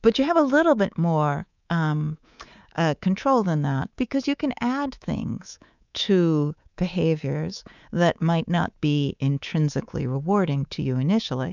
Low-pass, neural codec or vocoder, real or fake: 7.2 kHz; codec, 24 kHz, 3.1 kbps, DualCodec; fake